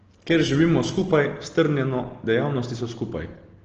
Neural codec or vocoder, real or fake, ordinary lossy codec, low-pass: none; real; Opus, 16 kbps; 7.2 kHz